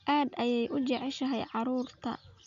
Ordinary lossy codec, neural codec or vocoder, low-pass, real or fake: none; none; 7.2 kHz; real